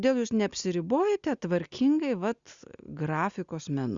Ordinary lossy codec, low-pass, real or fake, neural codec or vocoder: Opus, 64 kbps; 7.2 kHz; real; none